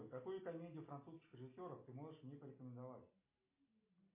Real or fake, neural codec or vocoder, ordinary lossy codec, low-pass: real; none; MP3, 24 kbps; 3.6 kHz